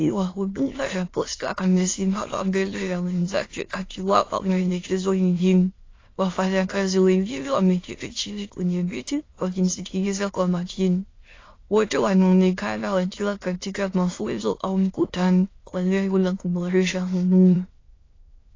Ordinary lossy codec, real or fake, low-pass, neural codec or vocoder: AAC, 32 kbps; fake; 7.2 kHz; autoencoder, 22.05 kHz, a latent of 192 numbers a frame, VITS, trained on many speakers